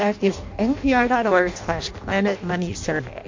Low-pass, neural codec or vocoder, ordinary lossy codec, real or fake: 7.2 kHz; codec, 16 kHz in and 24 kHz out, 0.6 kbps, FireRedTTS-2 codec; MP3, 48 kbps; fake